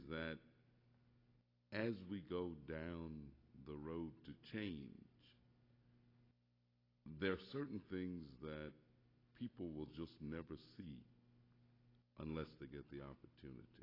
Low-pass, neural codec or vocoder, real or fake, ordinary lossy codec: 5.4 kHz; none; real; AAC, 24 kbps